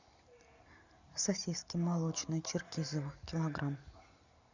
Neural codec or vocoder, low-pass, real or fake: none; 7.2 kHz; real